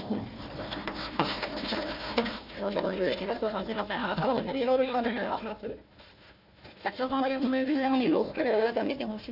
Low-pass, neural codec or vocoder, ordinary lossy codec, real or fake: 5.4 kHz; codec, 16 kHz, 1 kbps, FunCodec, trained on Chinese and English, 50 frames a second; none; fake